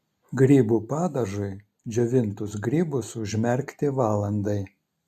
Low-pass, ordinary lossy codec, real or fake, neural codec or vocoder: 14.4 kHz; MP3, 96 kbps; real; none